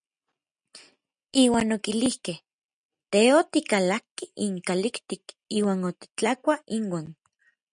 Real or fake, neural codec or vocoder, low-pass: real; none; 9.9 kHz